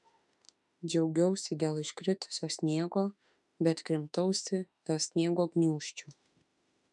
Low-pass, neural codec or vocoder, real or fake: 10.8 kHz; autoencoder, 48 kHz, 32 numbers a frame, DAC-VAE, trained on Japanese speech; fake